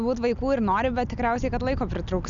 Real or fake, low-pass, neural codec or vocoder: real; 7.2 kHz; none